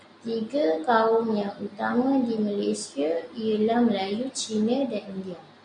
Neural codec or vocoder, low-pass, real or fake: none; 9.9 kHz; real